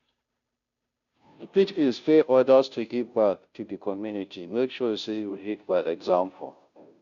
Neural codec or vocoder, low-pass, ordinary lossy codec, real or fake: codec, 16 kHz, 0.5 kbps, FunCodec, trained on Chinese and English, 25 frames a second; 7.2 kHz; none; fake